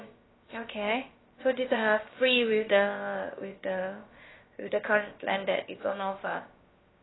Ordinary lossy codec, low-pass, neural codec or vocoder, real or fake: AAC, 16 kbps; 7.2 kHz; codec, 16 kHz, about 1 kbps, DyCAST, with the encoder's durations; fake